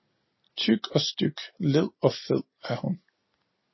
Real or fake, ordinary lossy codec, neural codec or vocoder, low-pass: real; MP3, 24 kbps; none; 7.2 kHz